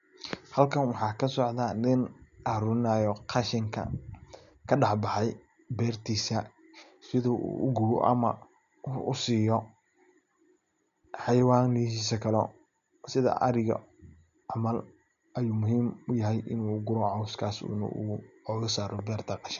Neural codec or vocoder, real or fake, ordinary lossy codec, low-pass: none; real; none; 7.2 kHz